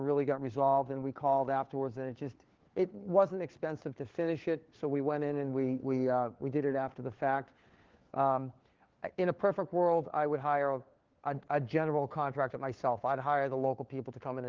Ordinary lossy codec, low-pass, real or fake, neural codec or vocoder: Opus, 16 kbps; 7.2 kHz; fake; codec, 16 kHz, 4 kbps, FunCodec, trained on LibriTTS, 50 frames a second